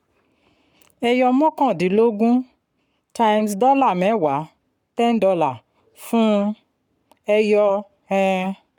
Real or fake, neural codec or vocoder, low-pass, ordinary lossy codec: fake; codec, 44.1 kHz, 7.8 kbps, Pupu-Codec; 19.8 kHz; none